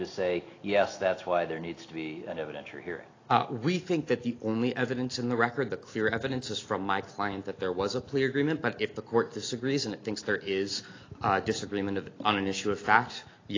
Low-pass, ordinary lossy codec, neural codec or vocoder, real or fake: 7.2 kHz; AAC, 32 kbps; none; real